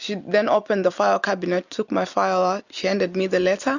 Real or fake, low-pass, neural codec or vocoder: real; 7.2 kHz; none